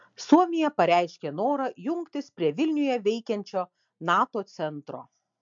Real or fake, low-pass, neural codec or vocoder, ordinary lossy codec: real; 7.2 kHz; none; MP3, 64 kbps